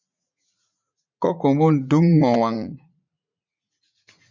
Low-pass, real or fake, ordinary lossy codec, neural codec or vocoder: 7.2 kHz; fake; MP3, 64 kbps; vocoder, 44.1 kHz, 80 mel bands, Vocos